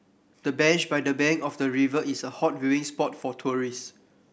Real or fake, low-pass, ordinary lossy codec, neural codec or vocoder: real; none; none; none